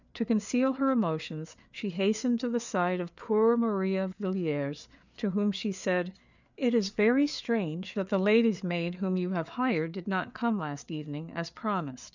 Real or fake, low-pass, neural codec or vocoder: fake; 7.2 kHz; codec, 16 kHz, 4 kbps, FreqCodec, larger model